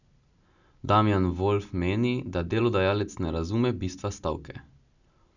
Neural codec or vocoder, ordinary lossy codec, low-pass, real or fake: none; none; 7.2 kHz; real